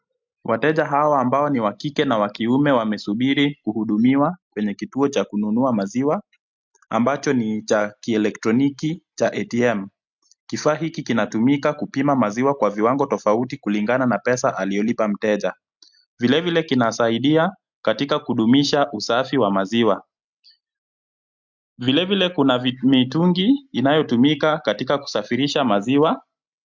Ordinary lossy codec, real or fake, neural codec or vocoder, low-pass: MP3, 64 kbps; real; none; 7.2 kHz